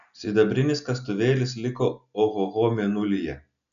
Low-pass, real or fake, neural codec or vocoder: 7.2 kHz; real; none